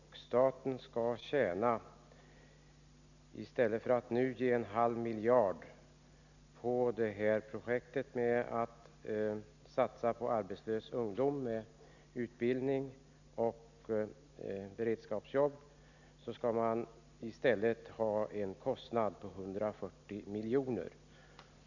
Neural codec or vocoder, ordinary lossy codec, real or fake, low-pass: none; none; real; 7.2 kHz